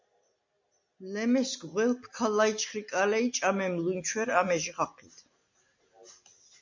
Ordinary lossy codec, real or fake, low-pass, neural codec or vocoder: MP3, 64 kbps; real; 7.2 kHz; none